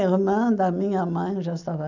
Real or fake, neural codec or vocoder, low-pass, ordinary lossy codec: real; none; 7.2 kHz; none